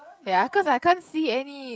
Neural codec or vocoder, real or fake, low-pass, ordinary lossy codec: codec, 16 kHz, 16 kbps, FreqCodec, smaller model; fake; none; none